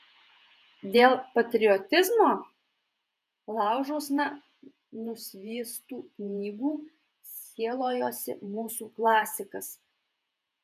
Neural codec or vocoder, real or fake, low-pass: vocoder, 48 kHz, 128 mel bands, Vocos; fake; 14.4 kHz